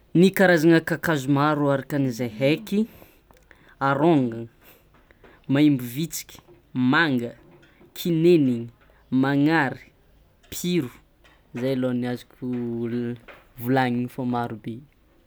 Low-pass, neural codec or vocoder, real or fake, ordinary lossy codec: none; none; real; none